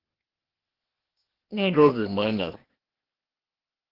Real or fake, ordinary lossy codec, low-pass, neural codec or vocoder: fake; Opus, 16 kbps; 5.4 kHz; codec, 16 kHz, 0.8 kbps, ZipCodec